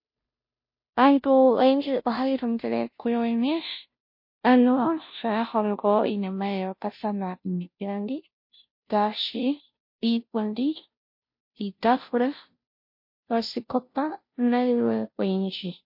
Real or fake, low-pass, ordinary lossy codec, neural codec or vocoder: fake; 5.4 kHz; MP3, 32 kbps; codec, 16 kHz, 0.5 kbps, FunCodec, trained on Chinese and English, 25 frames a second